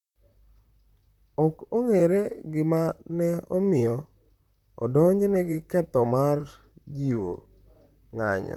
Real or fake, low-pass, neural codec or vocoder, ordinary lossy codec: fake; 19.8 kHz; vocoder, 44.1 kHz, 128 mel bands, Pupu-Vocoder; none